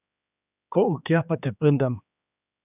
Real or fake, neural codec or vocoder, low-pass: fake; codec, 16 kHz, 2 kbps, X-Codec, HuBERT features, trained on general audio; 3.6 kHz